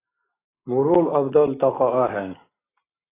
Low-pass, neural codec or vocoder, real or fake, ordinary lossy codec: 3.6 kHz; none; real; AAC, 16 kbps